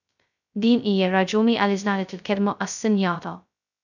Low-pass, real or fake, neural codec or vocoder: 7.2 kHz; fake; codec, 16 kHz, 0.2 kbps, FocalCodec